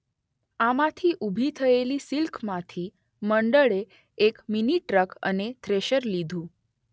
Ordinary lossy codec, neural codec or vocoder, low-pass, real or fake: none; none; none; real